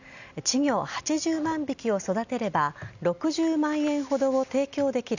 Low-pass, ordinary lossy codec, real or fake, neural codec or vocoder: 7.2 kHz; none; real; none